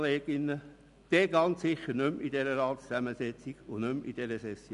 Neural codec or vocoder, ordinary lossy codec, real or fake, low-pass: none; none; real; 10.8 kHz